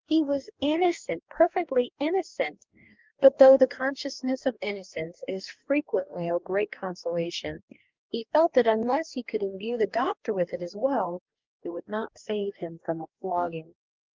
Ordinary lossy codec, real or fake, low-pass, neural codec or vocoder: Opus, 24 kbps; fake; 7.2 kHz; codec, 44.1 kHz, 2.6 kbps, DAC